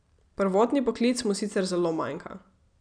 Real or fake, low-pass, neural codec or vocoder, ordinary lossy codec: real; 9.9 kHz; none; none